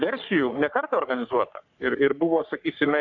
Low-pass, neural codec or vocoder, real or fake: 7.2 kHz; codec, 44.1 kHz, 3.4 kbps, Pupu-Codec; fake